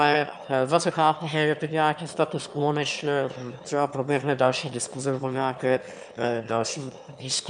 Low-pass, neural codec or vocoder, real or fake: 9.9 kHz; autoencoder, 22.05 kHz, a latent of 192 numbers a frame, VITS, trained on one speaker; fake